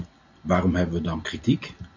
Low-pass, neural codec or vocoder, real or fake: 7.2 kHz; none; real